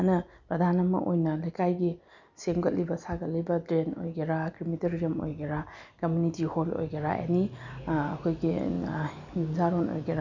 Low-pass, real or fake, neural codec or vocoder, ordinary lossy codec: 7.2 kHz; real; none; none